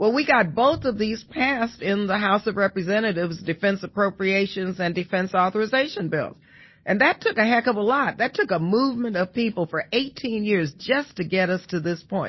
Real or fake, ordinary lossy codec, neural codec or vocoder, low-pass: real; MP3, 24 kbps; none; 7.2 kHz